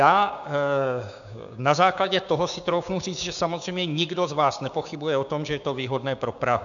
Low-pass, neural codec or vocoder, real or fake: 7.2 kHz; codec, 16 kHz, 6 kbps, DAC; fake